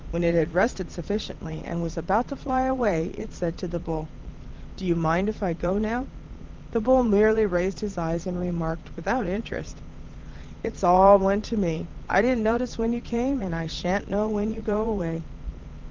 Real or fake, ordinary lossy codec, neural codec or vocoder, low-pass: fake; Opus, 32 kbps; vocoder, 22.05 kHz, 80 mel bands, Vocos; 7.2 kHz